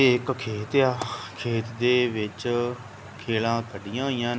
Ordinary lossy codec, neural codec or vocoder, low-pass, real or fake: none; none; none; real